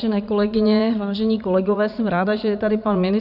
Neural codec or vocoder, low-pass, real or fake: vocoder, 44.1 kHz, 80 mel bands, Vocos; 5.4 kHz; fake